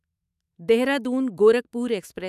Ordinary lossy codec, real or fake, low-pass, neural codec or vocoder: none; fake; 14.4 kHz; autoencoder, 48 kHz, 128 numbers a frame, DAC-VAE, trained on Japanese speech